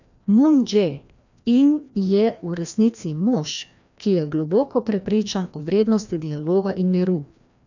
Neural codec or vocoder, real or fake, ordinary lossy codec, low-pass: codec, 16 kHz, 1 kbps, FreqCodec, larger model; fake; none; 7.2 kHz